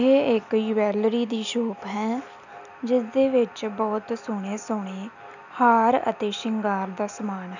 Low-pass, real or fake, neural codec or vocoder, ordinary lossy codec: 7.2 kHz; real; none; none